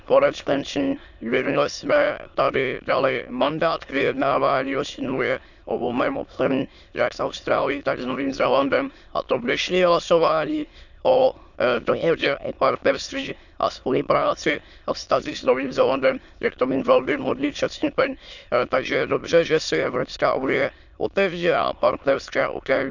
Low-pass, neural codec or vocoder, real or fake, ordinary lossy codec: 7.2 kHz; autoencoder, 22.05 kHz, a latent of 192 numbers a frame, VITS, trained on many speakers; fake; none